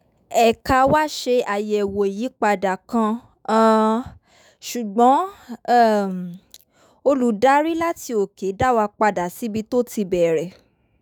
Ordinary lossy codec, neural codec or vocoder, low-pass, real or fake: none; autoencoder, 48 kHz, 128 numbers a frame, DAC-VAE, trained on Japanese speech; none; fake